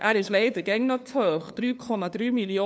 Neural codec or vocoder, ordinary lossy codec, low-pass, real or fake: codec, 16 kHz, 2 kbps, FunCodec, trained on LibriTTS, 25 frames a second; none; none; fake